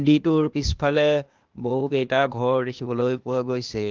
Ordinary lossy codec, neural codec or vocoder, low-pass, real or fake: Opus, 32 kbps; codec, 16 kHz, 0.8 kbps, ZipCodec; 7.2 kHz; fake